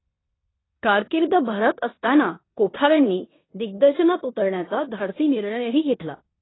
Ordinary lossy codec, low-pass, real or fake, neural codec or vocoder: AAC, 16 kbps; 7.2 kHz; fake; codec, 16 kHz in and 24 kHz out, 0.9 kbps, LongCat-Audio-Codec, four codebook decoder